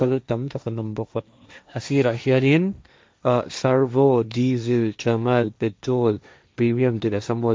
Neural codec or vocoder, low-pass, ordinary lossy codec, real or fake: codec, 16 kHz, 1.1 kbps, Voila-Tokenizer; none; none; fake